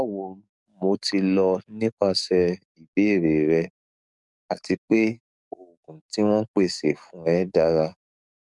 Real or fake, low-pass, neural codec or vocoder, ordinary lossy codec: fake; 10.8 kHz; codec, 44.1 kHz, 7.8 kbps, DAC; none